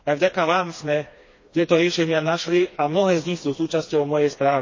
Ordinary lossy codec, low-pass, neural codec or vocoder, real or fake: MP3, 32 kbps; 7.2 kHz; codec, 16 kHz, 2 kbps, FreqCodec, smaller model; fake